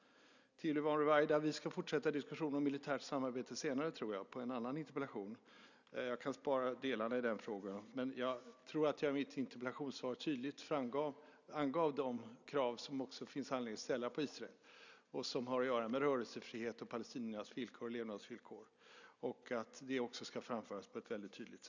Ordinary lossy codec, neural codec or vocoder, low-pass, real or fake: none; none; 7.2 kHz; real